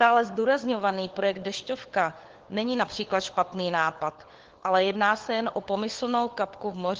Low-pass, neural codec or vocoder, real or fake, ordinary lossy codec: 7.2 kHz; codec, 16 kHz, 4 kbps, FunCodec, trained on LibriTTS, 50 frames a second; fake; Opus, 16 kbps